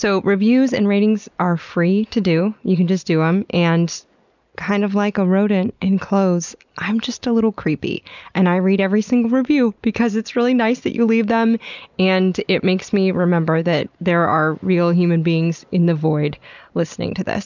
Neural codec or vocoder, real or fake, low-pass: none; real; 7.2 kHz